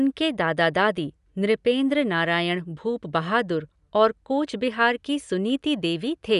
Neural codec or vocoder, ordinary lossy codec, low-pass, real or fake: none; none; 10.8 kHz; real